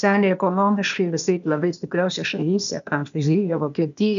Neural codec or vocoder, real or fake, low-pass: codec, 16 kHz, 0.8 kbps, ZipCodec; fake; 7.2 kHz